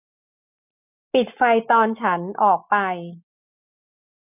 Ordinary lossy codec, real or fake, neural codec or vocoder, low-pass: none; real; none; 3.6 kHz